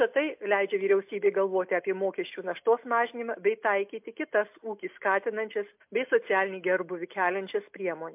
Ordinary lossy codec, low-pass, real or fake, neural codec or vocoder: MP3, 32 kbps; 3.6 kHz; real; none